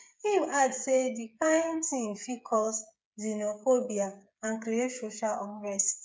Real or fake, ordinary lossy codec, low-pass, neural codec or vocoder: fake; none; none; codec, 16 kHz, 8 kbps, FreqCodec, smaller model